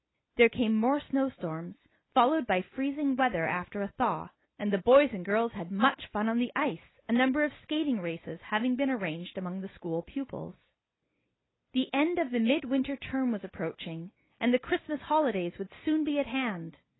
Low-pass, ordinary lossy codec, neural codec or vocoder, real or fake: 7.2 kHz; AAC, 16 kbps; none; real